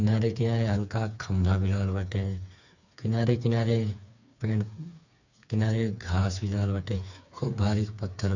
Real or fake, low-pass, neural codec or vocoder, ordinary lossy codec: fake; 7.2 kHz; codec, 16 kHz, 4 kbps, FreqCodec, smaller model; none